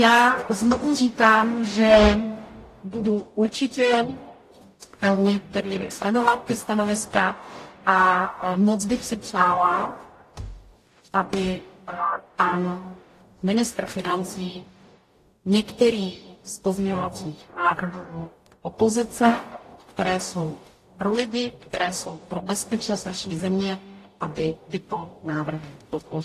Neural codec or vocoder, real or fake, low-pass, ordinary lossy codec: codec, 44.1 kHz, 0.9 kbps, DAC; fake; 14.4 kHz; AAC, 48 kbps